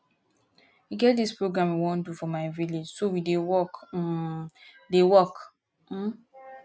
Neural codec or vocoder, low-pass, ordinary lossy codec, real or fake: none; none; none; real